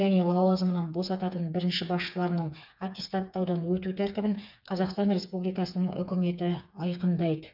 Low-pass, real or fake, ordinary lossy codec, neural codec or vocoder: 5.4 kHz; fake; none; codec, 16 kHz, 4 kbps, FreqCodec, smaller model